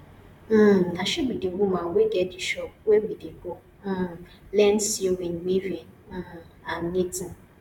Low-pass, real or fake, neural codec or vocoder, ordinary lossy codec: 19.8 kHz; fake; vocoder, 44.1 kHz, 128 mel bands, Pupu-Vocoder; none